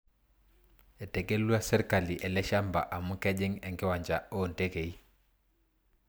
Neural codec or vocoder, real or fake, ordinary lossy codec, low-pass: none; real; none; none